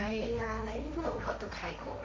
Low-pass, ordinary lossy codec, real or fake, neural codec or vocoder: none; none; fake; codec, 16 kHz, 1.1 kbps, Voila-Tokenizer